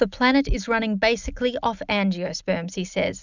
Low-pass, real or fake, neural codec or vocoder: 7.2 kHz; real; none